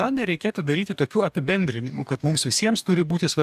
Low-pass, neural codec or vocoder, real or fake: 14.4 kHz; codec, 44.1 kHz, 2.6 kbps, DAC; fake